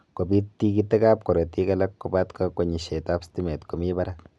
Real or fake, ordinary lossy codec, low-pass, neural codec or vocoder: real; none; none; none